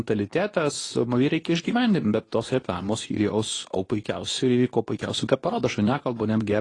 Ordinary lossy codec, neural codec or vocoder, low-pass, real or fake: AAC, 32 kbps; codec, 24 kHz, 0.9 kbps, WavTokenizer, medium speech release version 2; 10.8 kHz; fake